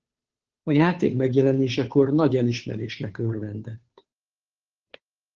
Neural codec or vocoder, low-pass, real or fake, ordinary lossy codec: codec, 16 kHz, 2 kbps, FunCodec, trained on Chinese and English, 25 frames a second; 7.2 kHz; fake; Opus, 16 kbps